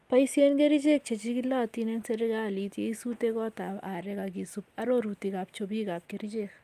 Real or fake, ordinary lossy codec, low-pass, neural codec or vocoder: real; Opus, 32 kbps; 14.4 kHz; none